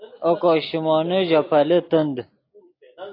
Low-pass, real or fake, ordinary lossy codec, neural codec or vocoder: 5.4 kHz; real; AAC, 32 kbps; none